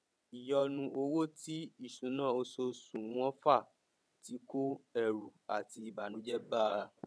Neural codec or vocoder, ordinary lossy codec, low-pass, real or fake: vocoder, 22.05 kHz, 80 mel bands, WaveNeXt; none; none; fake